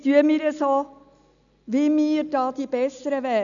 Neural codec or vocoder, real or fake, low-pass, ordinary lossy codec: none; real; 7.2 kHz; none